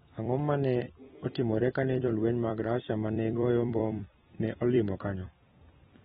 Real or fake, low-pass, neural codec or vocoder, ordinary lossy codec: fake; 19.8 kHz; vocoder, 44.1 kHz, 128 mel bands every 256 samples, BigVGAN v2; AAC, 16 kbps